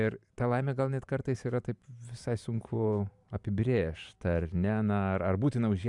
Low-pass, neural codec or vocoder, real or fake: 10.8 kHz; vocoder, 44.1 kHz, 128 mel bands every 512 samples, BigVGAN v2; fake